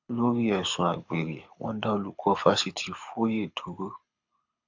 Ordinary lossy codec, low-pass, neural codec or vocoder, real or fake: AAC, 48 kbps; 7.2 kHz; codec, 24 kHz, 6 kbps, HILCodec; fake